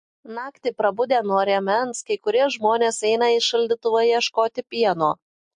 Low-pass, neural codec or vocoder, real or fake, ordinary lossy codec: 9.9 kHz; none; real; MP3, 48 kbps